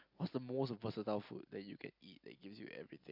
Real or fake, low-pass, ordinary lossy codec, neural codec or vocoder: real; 5.4 kHz; MP3, 48 kbps; none